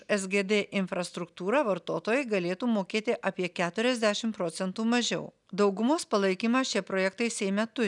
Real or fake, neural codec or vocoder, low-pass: real; none; 10.8 kHz